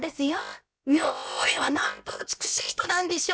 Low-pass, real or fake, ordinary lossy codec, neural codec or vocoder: none; fake; none; codec, 16 kHz, about 1 kbps, DyCAST, with the encoder's durations